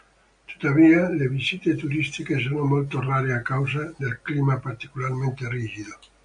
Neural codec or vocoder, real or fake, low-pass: none; real; 9.9 kHz